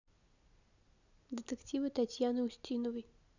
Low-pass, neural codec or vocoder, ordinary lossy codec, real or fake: 7.2 kHz; none; none; real